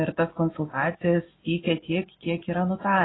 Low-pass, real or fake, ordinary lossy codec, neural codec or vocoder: 7.2 kHz; real; AAC, 16 kbps; none